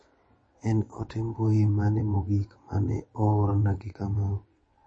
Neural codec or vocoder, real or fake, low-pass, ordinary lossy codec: vocoder, 22.05 kHz, 80 mel bands, WaveNeXt; fake; 9.9 kHz; MP3, 32 kbps